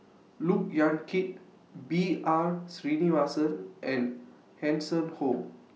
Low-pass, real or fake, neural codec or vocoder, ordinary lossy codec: none; real; none; none